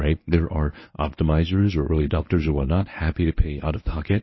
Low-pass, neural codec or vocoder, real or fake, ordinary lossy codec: 7.2 kHz; codec, 24 kHz, 0.9 kbps, WavTokenizer, medium speech release version 1; fake; MP3, 24 kbps